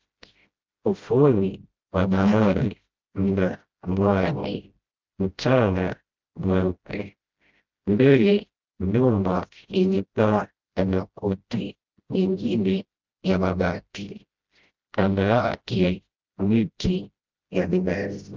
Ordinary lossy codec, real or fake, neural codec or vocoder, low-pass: Opus, 24 kbps; fake; codec, 16 kHz, 0.5 kbps, FreqCodec, smaller model; 7.2 kHz